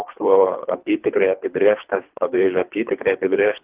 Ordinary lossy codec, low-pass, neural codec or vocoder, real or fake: Opus, 24 kbps; 3.6 kHz; codec, 24 kHz, 3 kbps, HILCodec; fake